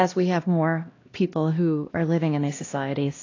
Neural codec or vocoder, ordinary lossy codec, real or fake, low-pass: codec, 16 kHz, 1 kbps, X-Codec, HuBERT features, trained on LibriSpeech; AAC, 32 kbps; fake; 7.2 kHz